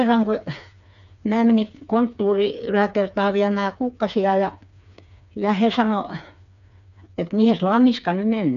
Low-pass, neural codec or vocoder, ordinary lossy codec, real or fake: 7.2 kHz; codec, 16 kHz, 4 kbps, FreqCodec, smaller model; none; fake